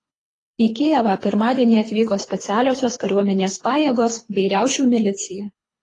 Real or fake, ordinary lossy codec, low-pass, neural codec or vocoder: fake; AAC, 32 kbps; 10.8 kHz; codec, 24 kHz, 3 kbps, HILCodec